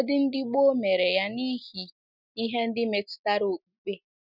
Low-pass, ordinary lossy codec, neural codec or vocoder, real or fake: 5.4 kHz; none; none; real